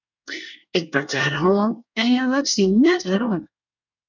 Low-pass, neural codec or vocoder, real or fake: 7.2 kHz; codec, 24 kHz, 1 kbps, SNAC; fake